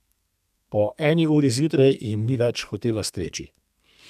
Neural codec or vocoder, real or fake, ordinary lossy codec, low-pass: codec, 32 kHz, 1.9 kbps, SNAC; fake; none; 14.4 kHz